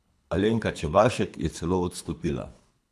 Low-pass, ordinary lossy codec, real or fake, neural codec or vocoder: none; none; fake; codec, 24 kHz, 3 kbps, HILCodec